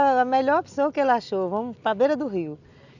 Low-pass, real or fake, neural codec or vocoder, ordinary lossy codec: 7.2 kHz; real; none; none